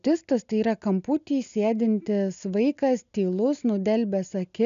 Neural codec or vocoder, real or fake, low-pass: none; real; 7.2 kHz